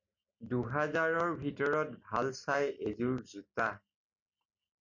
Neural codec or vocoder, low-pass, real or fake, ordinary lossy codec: none; 7.2 kHz; real; MP3, 48 kbps